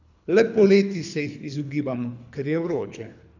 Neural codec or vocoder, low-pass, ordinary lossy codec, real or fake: codec, 24 kHz, 3 kbps, HILCodec; 7.2 kHz; none; fake